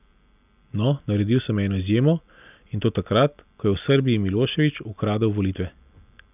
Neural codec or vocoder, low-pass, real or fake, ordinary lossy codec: none; 3.6 kHz; real; none